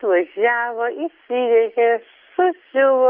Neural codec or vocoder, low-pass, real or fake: none; 5.4 kHz; real